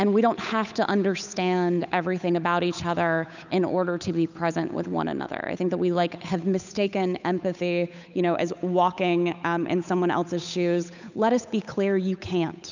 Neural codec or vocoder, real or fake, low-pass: codec, 16 kHz, 8 kbps, FunCodec, trained on Chinese and English, 25 frames a second; fake; 7.2 kHz